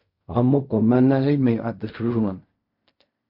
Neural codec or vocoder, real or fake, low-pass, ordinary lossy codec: codec, 16 kHz in and 24 kHz out, 0.4 kbps, LongCat-Audio-Codec, fine tuned four codebook decoder; fake; 5.4 kHz; MP3, 32 kbps